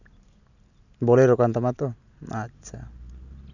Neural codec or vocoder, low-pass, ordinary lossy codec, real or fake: none; 7.2 kHz; none; real